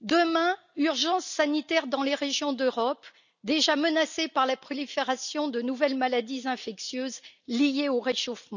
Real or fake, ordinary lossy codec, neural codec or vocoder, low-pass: real; none; none; 7.2 kHz